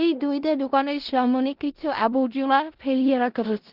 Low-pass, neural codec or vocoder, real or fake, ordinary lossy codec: 5.4 kHz; codec, 16 kHz in and 24 kHz out, 0.4 kbps, LongCat-Audio-Codec, four codebook decoder; fake; Opus, 16 kbps